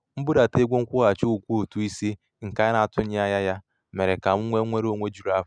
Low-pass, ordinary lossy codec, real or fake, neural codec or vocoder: 9.9 kHz; none; real; none